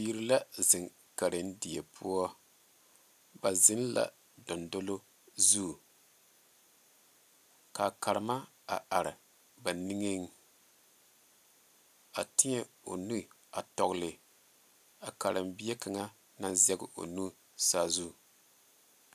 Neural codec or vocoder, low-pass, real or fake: none; 14.4 kHz; real